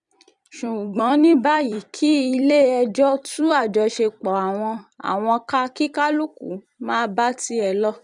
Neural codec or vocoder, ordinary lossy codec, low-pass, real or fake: vocoder, 44.1 kHz, 128 mel bands every 256 samples, BigVGAN v2; none; 10.8 kHz; fake